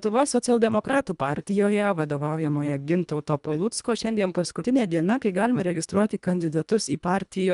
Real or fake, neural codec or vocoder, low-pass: fake; codec, 24 kHz, 1.5 kbps, HILCodec; 10.8 kHz